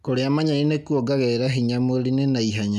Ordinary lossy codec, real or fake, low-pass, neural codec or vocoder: none; real; 14.4 kHz; none